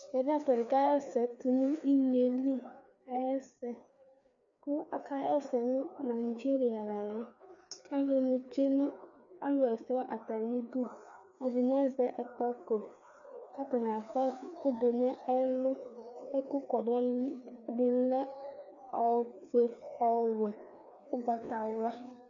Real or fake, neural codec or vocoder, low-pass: fake; codec, 16 kHz, 2 kbps, FreqCodec, larger model; 7.2 kHz